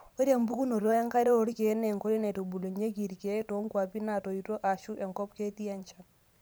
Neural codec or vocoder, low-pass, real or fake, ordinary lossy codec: vocoder, 44.1 kHz, 128 mel bands every 512 samples, BigVGAN v2; none; fake; none